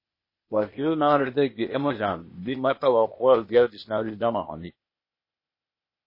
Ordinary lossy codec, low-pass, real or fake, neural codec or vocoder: MP3, 24 kbps; 5.4 kHz; fake; codec, 16 kHz, 0.8 kbps, ZipCodec